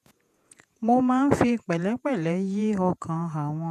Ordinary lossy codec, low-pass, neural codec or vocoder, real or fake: none; 14.4 kHz; vocoder, 48 kHz, 128 mel bands, Vocos; fake